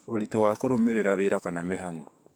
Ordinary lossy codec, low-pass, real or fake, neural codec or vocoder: none; none; fake; codec, 44.1 kHz, 2.6 kbps, SNAC